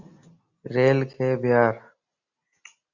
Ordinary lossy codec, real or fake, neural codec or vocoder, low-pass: Opus, 64 kbps; real; none; 7.2 kHz